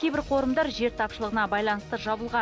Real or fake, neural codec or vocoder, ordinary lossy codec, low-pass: real; none; none; none